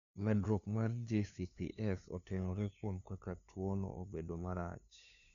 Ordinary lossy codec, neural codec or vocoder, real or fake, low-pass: none; codec, 16 kHz, 2 kbps, FunCodec, trained on LibriTTS, 25 frames a second; fake; 7.2 kHz